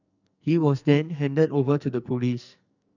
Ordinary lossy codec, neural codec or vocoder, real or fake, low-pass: none; codec, 44.1 kHz, 2.6 kbps, SNAC; fake; 7.2 kHz